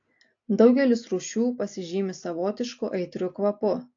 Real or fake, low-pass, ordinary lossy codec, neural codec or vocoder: real; 7.2 kHz; AAC, 48 kbps; none